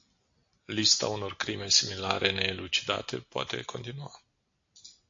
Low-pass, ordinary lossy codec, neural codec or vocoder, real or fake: 7.2 kHz; AAC, 48 kbps; none; real